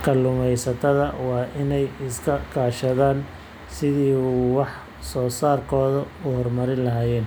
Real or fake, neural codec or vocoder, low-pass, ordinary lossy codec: real; none; none; none